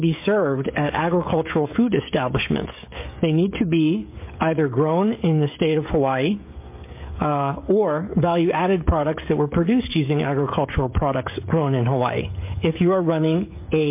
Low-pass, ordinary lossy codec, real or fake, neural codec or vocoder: 3.6 kHz; MP3, 24 kbps; fake; codec, 16 kHz, 16 kbps, FreqCodec, smaller model